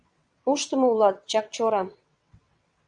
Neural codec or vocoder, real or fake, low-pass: vocoder, 22.05 kHz, 80 mel bands, WaveNeXt; fake; 9.9 kHz